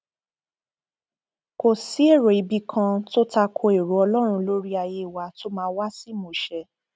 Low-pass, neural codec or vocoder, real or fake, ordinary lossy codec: none; none; real; none